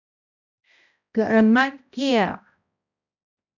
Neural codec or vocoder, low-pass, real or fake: codec, 16 kHz, 0.5 kbps, X-Codec, HuBERT features, trained on balanced general audio; 7.2 kHz; fake